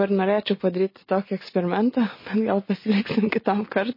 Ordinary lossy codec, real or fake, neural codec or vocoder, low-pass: MP3, 24 kbps; real; none; 5.4 kHz